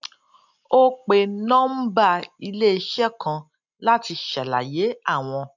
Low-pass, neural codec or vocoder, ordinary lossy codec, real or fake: 7.2 kHz; none; none; real